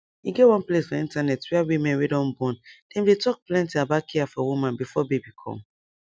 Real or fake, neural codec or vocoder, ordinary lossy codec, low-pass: real; none; none; none